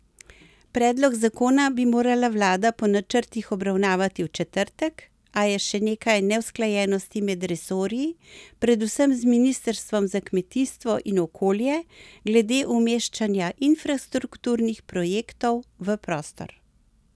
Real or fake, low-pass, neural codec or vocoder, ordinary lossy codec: real; none; none; none